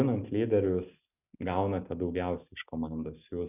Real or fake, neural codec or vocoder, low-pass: real; none; 3.6 kHz